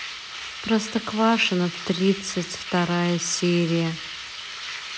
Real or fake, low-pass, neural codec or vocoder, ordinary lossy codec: real; none; none; none